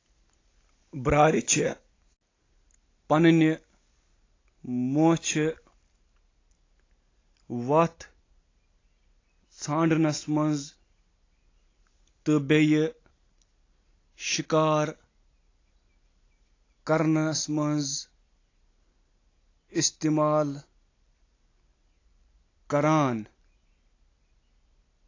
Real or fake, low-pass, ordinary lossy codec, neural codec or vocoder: real; 7.2 kHz; AAC, 32 kbps; none